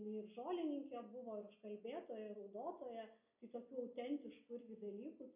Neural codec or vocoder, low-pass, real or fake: none; 3.6 kHz; real